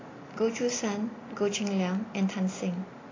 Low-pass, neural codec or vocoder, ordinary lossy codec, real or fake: 7.2 kHz; none; AAC, 32 kbps; real